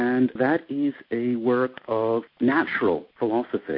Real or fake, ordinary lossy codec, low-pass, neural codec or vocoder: real; MP3, 32 kbps; 5.4 kHz; none